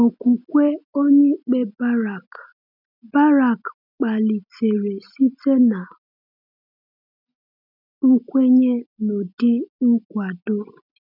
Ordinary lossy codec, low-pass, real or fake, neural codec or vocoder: none; 5.4 kHz; real; none